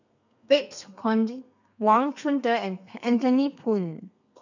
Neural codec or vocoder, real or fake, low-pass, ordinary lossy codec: codec, 32 kHz, 1.9 kbps, SNAC; fake; 7.2 kHz; none